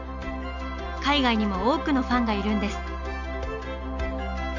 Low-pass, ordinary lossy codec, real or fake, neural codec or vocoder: 7.2 kHz; none; real; none